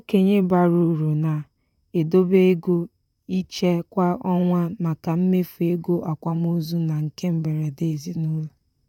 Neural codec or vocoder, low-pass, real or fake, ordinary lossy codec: vocoder, 44.1 kHz, 128 mel bands, Pupu-Vocoder; 19.8 kHz; fake; none